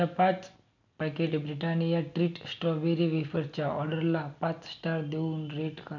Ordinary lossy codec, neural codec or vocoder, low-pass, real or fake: none; none; 7.2 kHz; real